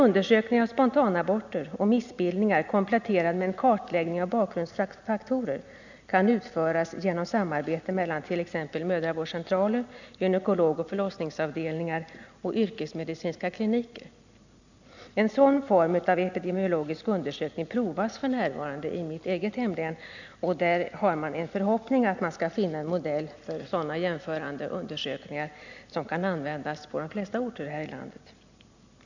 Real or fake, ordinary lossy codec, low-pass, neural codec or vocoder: real; none; 7.2 kHz; none